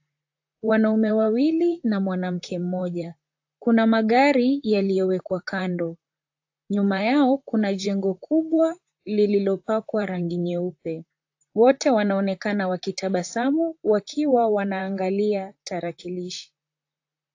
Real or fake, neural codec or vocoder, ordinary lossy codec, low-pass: fake; vocoder, 44.1 kHz, 128 mel bands, Pupu-Vocoder; AAC, 48 kbps; 7.2 kHz